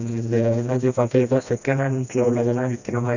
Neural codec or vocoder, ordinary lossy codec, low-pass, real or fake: codec, 16 kHz, 1 kbps, FreqCodec, smaller model; none; 7.2 kHz; fake